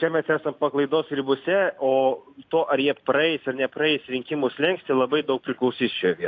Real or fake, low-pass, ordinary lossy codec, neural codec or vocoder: real; 7.2 kHz; AAC, 48 kbps; none